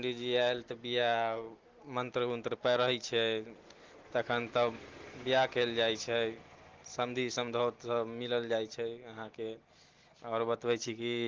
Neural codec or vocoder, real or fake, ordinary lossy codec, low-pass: none; real; Opus, 16 kbps; 7.2 kHz